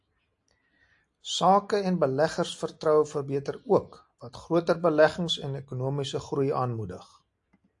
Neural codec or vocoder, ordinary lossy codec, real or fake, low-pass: none; AAC, 64 kbps; real; 10.8 kHz